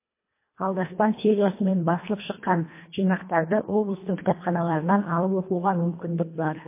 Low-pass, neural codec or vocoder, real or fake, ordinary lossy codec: 3.6 kHz; codec, 24 kHz, 1.5 kbps, HILCodec; fake; MP3, 24 kbps